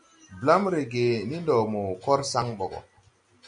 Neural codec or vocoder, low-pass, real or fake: none; 9.9 kHz; real